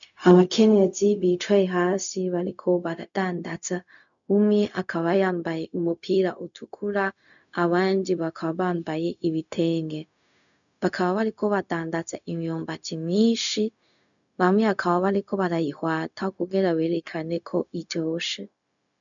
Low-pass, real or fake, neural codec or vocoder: 7.2 kHz; fake; codec, 16 kHz, 0.4 kbps, LongCat-Audio-Codec